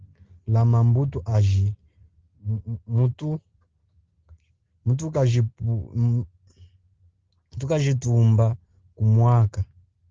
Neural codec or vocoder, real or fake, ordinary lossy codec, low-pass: none; real; Opus, 16 kbps; 7.2 kHz